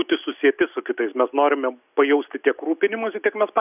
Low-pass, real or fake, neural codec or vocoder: 3.6 kHz; real; none